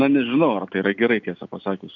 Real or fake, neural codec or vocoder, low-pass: real; none; 7.2 kHz